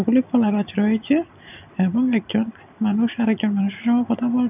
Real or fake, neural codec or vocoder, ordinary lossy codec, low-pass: real; none; none; 3.6 kHz